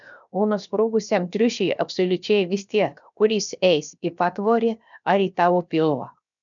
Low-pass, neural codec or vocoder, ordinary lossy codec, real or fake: 7.2 kHz; codec, 16 kHz, 0.7 kbps, FocalCodec; MP3, 96 kbps; fake